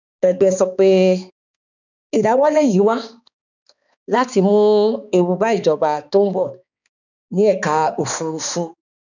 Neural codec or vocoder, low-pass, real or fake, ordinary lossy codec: codec, 16 kHz, 2 kbps, X-Codec, HuBERT features, trained on balanced general audio; 7.2 kHz; fake; none